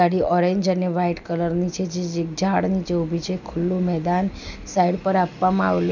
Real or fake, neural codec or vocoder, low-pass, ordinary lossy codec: real; none; 7.2 kHz; none